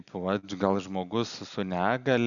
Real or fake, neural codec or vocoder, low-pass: real; none; 7.2 kHz